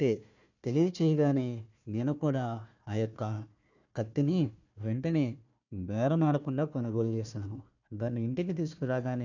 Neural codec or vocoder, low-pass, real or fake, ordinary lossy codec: codec, 16 kHz, 1 kbps, FunCodec, trained on Chinese and English, 50 frames a second; 7.2 kHz; fake; none